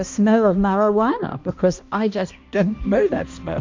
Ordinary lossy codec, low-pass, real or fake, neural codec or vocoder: MP3, 64 kbps; 7.2 kHz; fake; codec, 16 kHz, 1 kbps, X-Codec, HuBERT features, trained on balanced general audio